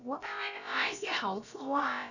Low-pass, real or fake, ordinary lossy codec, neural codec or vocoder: 7.2 kHz; fake; none; codec, 16 kHz, about 1 kbps, DyCAST, with the encoder's durations